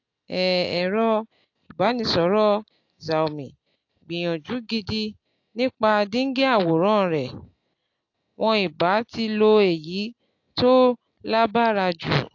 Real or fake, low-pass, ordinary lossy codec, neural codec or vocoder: real; 7.2 kHz; AAC, 48 kbps; none